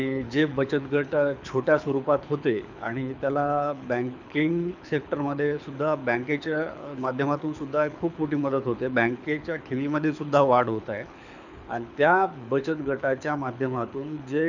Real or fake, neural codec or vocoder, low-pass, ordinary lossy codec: fake; codec, 24 kHz, 6 kbps, HILCodec; 7.2 kHz; none